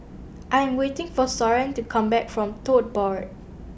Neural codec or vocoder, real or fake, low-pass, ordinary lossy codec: none; real; none; none